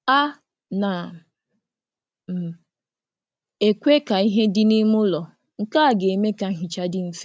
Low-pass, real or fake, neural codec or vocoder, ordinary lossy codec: none; real; none; none